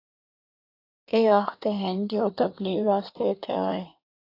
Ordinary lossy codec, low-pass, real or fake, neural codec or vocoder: AAC, 32 kbps; 5.4 kHz; fake; codec, 16 kHz in and 24 kHz out, 1.1 kbps, FireRedTTS-2 codec